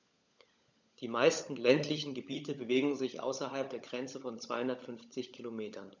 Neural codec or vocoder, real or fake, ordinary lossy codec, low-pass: codec, 16 kHz, 16 kbps, FunCodec, trained on LibriTTS, 50 frames a second; fake; none; 7.2 kHz